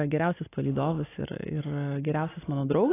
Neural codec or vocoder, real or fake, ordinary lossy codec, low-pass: none; real; AAC, 16 kbps; 3.6 kHz